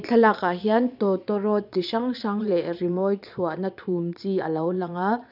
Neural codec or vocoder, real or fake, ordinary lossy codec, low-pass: vocoder, 22.05 kHz, 80 mel bands, Vocos; fake; none; 5.4 kHz